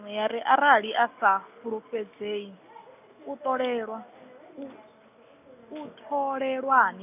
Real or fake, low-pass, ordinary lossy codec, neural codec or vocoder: real; 3.6 kHz; none; none